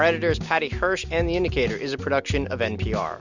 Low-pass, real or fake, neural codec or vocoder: 7.2 kHz; real; none